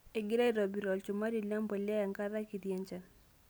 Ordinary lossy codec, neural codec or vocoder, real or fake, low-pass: none; none; real; none